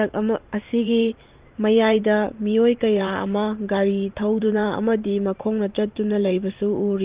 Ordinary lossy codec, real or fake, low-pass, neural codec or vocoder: Opus, 32 kbps; fake; 3.6 kHz; vocoder, 44.1 kHz, 128 mel bands every 512 samples, BigVGAN v2